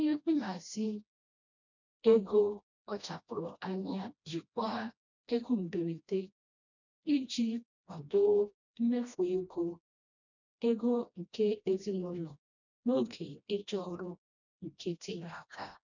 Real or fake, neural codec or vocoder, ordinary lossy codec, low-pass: fake; codec, 16 kHz, 1 kbps, FreqCodec, smaller model; none; 7.2 kHz